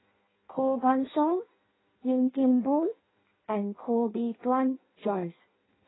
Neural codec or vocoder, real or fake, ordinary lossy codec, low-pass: codec, 16 kHz in and 24 kHz out, 0.6 kbps, FireRedTTS-2 codec; fake; AAC, 16 kbps; 7.2 kHz